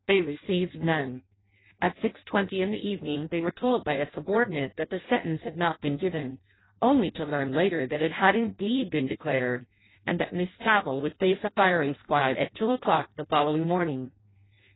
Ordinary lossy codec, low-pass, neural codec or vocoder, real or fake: AAC, 16 kbps; 7.2 kHz; codec, 16 kHz in and 24 kHz out, 0.6 kbps, FireRedTTS-2 codec; fake